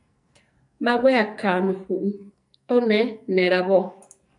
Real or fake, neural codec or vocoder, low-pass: fake; codec, 44.1 kHz, 2.6 kbps, SNAC; 10.8 kHz